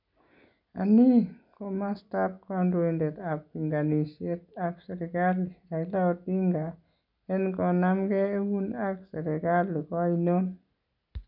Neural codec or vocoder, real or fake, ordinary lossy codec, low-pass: none; real; none; 5.4 kHz